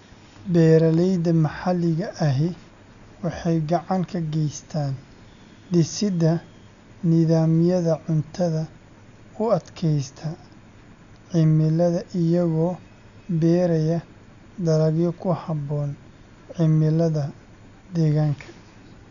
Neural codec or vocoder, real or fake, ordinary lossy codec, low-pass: none; real; none; 7.2 kHz